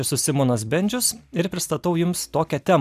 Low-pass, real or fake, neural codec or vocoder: 14.4 kHz; real; none